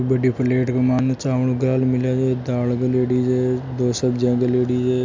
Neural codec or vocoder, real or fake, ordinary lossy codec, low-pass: none; real; MP3, 64 kbps; 7.2 kHz